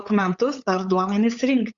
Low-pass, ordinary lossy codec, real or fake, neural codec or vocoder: 7.2 kHz; Opus, 64 kbps; fake; codec, 16 kHz, 8 kbps, FunCodec, trained on LibriTTS, 25 frames a second